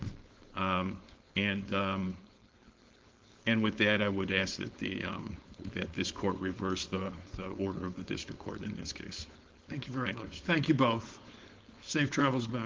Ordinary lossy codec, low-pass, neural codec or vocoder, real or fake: Opus, 16 kbps; 7.2 kHz; codec, 16 kHz, 4.8 kbps, FACodec; fake